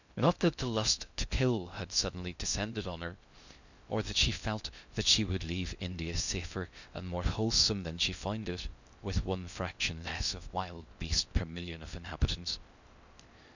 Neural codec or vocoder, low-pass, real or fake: codec, 16 kHz in and 24 kHz out, 0.6 kbps, FocalCodec, streaming, 2048 codes; 7.2 kHz; fake